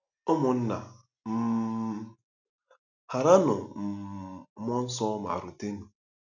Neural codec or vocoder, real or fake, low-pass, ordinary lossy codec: none; real; 7.2 kHz; none